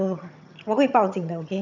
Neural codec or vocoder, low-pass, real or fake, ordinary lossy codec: vocoder, 22.05 kHz, 80 mel bands, HiFi-GAN; 7.2 kHz; fake; none